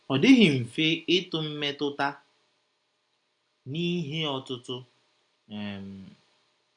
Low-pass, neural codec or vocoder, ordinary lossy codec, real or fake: 9.9 kHz; none; none; real